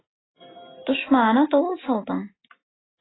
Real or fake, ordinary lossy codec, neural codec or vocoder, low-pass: real; AAC, 16 kbps; none; 7.2 kHz